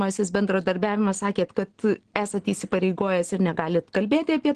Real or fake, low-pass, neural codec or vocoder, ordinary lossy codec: real; 9.9 kHz; none; Opus, 16 kbps